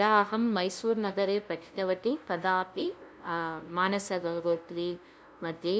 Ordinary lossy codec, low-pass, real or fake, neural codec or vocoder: none; none; fake; codec, 16 kHz, 0.5 kbps, FunCodec, trained on LibriTTS, 25 frames a second